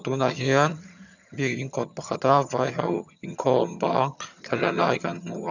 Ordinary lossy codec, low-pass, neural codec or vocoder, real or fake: none; 7.2 kHz; vocoder, 22.05 kHz, 80 mel bands, HiFi-GAN; fake